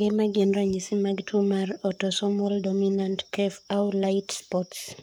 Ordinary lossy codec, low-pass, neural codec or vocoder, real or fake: none; none; codec, 44.1 kHz, 7.8 kbps, Pupu-Codec; fake